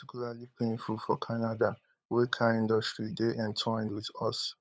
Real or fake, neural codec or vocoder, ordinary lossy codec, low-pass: fake; codec, 16 kHz, 8 kbps, FunCodec, trained on LibriTTS, 25 frames a second; none; none